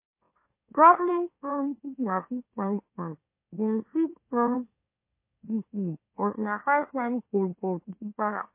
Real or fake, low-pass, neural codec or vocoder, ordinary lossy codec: fake; 3.6 kHz; autoencoder, 44.1 kHz, a latent of 192 numbers a frame, MeloTTS; MP3, 24 kbps